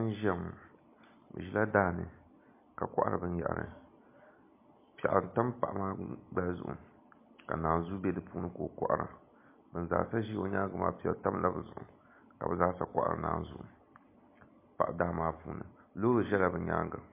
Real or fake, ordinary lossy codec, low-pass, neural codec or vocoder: real; MP3, 24 kbps; 3.6 kHz; none